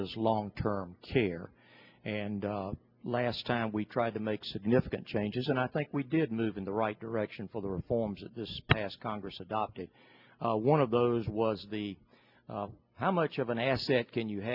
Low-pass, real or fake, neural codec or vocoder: 5.4 kHz; real; none